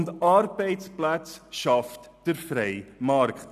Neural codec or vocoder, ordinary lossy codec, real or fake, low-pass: none; none; real; 14.4 kHz